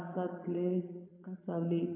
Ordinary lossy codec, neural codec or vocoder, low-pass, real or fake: none; vocoder, 22.05 kHz, 80 mel bands, Vocos; 3.6 kHz; fake